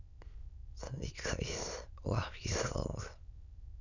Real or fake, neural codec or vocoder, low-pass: fake; autoencoder, 22.05 kHz, a latent of 192 numbers a frame, VITS, trained on many speakers; 7.2 kHz